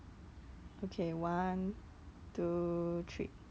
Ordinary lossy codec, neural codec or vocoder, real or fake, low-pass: none; none; real; none